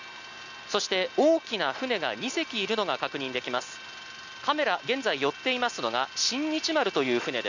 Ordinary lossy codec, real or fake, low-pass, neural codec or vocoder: none; real; 7.2 kHz; none